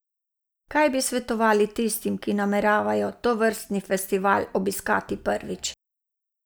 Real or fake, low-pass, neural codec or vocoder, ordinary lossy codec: real; none; none; none